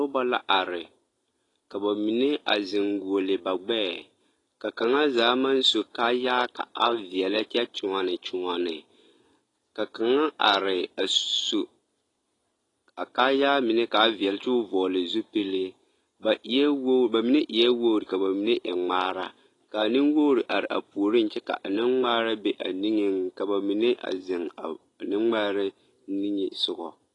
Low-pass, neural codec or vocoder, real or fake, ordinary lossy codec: 10.8 kHz; none; real; AAC, 32 kbps